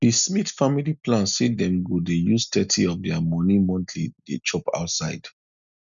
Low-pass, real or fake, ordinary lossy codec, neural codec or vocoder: 7.2 kHz; real; none; none